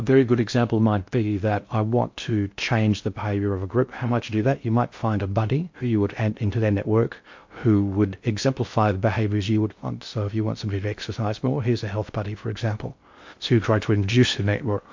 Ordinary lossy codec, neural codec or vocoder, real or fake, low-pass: MP3, 48 kbps; codec, 16 kHz in and 24 kHz out, 0.6 kbps, FocalCodec, streaming, 2048 codes; fake; 7.2 kHz